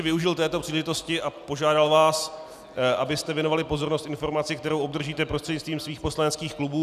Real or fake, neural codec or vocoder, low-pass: real; none; 14.4 kHz